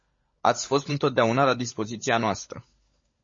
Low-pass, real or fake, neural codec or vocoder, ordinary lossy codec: 7.2 kHz; fake; codec, 16 kHz, 16 kbps, FunCodec, trained on LibriTTS, 50 frames a second; MP3, 32 kbps